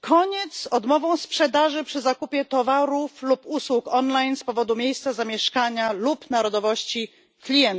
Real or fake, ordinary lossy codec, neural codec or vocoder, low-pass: real; none; none; none